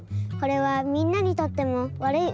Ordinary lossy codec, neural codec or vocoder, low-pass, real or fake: none; none; none; real